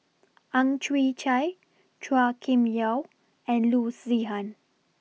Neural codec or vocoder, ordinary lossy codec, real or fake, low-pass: none; none; real; none